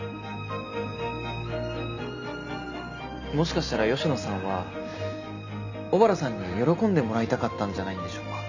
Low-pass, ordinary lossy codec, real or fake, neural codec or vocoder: 7.2 kHz; none; real; none